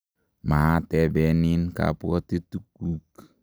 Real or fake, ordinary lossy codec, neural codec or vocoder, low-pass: real; none; none; none